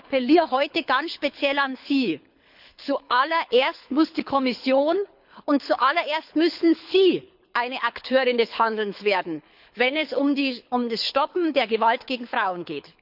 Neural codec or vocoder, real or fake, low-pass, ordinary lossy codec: codec, 24 kHz, 6 kbps, HILCodec; fake; 5.4 kHz; none